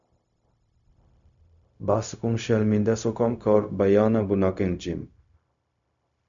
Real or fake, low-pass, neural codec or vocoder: fake; 7.2 kHz; codec, 16 kHz, 0.4 kbps, LongCat-Audio-Codec